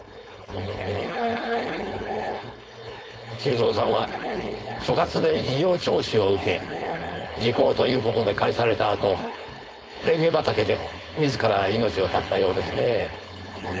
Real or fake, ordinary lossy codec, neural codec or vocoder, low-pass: fake; none; codec, 16 kHz, 4.8 kbps, FACodec; none